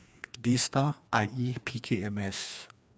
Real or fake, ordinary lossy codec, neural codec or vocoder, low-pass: fake; none; codec, 16 kHz, 2 kbps, FreqCodec, larger model; none